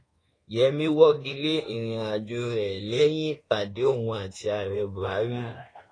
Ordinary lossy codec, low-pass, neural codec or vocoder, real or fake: AAC, 32 kbps; 9.9 kHz; codec, 24 kHz, 1.2 kbps, DualCodec; fake